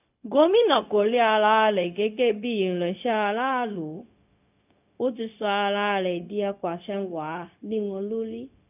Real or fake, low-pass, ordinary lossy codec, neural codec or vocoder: fake; 3.6 kHz; none; codec, 16 kHz, 0.4 kbps, LongCat-Audio-Codec